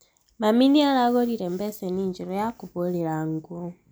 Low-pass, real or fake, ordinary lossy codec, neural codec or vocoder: none; real; none; none